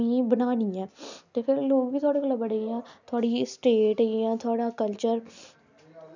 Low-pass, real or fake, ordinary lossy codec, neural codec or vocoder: 7.2 kHz; real; none; none